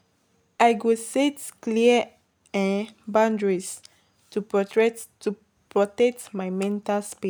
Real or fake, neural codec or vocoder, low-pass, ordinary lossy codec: real; none; none; none